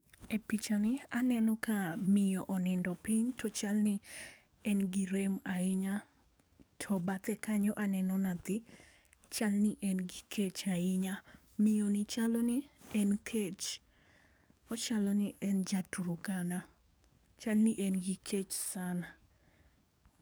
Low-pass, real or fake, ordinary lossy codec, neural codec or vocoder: none; fake; none; codec, 44.1 kHz, 7.8 kbps, DAC